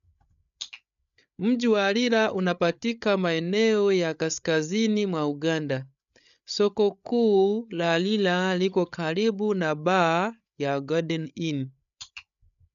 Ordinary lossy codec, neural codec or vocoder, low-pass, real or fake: none; codec, 16 kHz, 8 kbps, FreqCodec, larger model; 7.2 kHz; fake